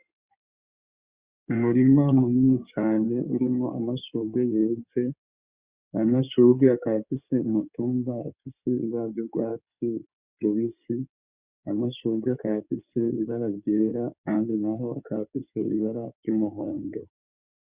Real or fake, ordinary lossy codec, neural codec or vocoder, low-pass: fake; Opus, 64 kbps; codec, 16 kHz in and 24 kHz out, 2.2 kbps, FireRedTTS-2 codec; 3.6 kHz